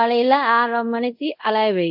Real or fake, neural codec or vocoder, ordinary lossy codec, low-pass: fake; codec, 24 kHz, 0.5 kbps, DualCodec; AAC, 48 kbps; 5.4 kHz